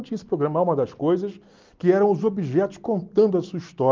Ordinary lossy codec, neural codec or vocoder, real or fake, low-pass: Opus, 32 kbps; none; real; 7.2 kHz